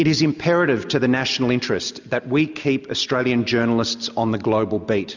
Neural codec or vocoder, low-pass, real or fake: none; 7.2 kHz; real